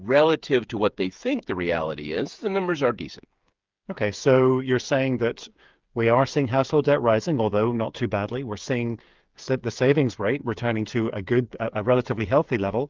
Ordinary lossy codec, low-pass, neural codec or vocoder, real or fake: Opus, 24 kbps; 7.2 kHz; codec, 16 kHz, 8 kbps, FreqCodec, smaller model; fake